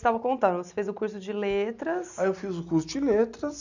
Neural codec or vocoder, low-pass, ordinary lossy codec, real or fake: none; 7.2 kHz; none; real